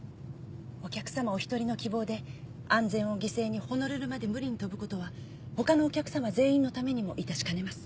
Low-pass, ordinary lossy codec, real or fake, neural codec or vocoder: none; none; real; none